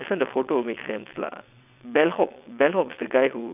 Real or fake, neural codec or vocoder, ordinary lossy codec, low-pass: fake; vocoder, 22.05 kHz, 80 mel bands, WaveNeXt; none; 3.6 kHz